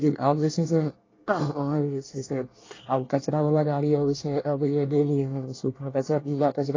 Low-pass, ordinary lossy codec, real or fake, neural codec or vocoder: 7.2 kHz; AAC, 32 kbps; fake; codec, 24 kHz, 1 kbps, SNAC